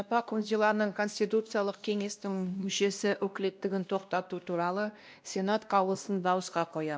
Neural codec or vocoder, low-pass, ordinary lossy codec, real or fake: codec, 16 kHz, 1 kbps, X-Codec, WavLM features, trained on Multilingual LibriSpeech; none; none; fake